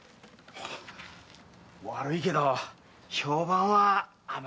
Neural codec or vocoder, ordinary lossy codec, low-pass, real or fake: none; none; none; real